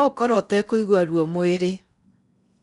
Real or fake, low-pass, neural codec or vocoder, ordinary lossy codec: fake; 10.8 kHz; codec, 16 kHz in and 24 kHz out, 0.8 kbps, FocalCodec, streaming, 65536 codes; none